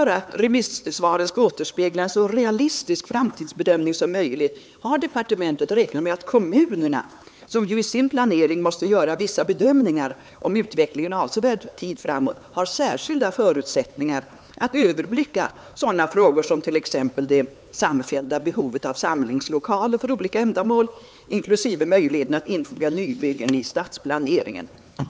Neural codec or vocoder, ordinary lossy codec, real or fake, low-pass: codec, 16 kHz, 4 kbps, X-Codec, HuBERT features, trained on LibriSpeech; none; fake; none